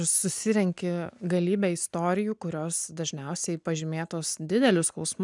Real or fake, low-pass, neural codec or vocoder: real; 10.8 kHz; none